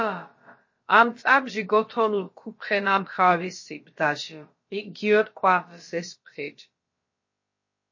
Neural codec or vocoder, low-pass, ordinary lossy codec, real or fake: codec, 16 kHz, about 1 kbps, DyCAST, with the encoder's durations; 7.2 kHz; MP3, 32 kbps; fake